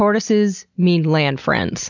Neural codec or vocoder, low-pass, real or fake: none; 7.2 kHz; real